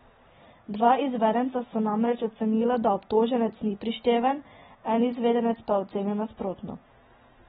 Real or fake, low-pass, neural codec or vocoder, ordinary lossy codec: real; 19.8 kHz; none; AAC, 16 kbps